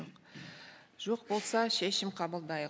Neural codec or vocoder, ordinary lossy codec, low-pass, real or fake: none; none; none; real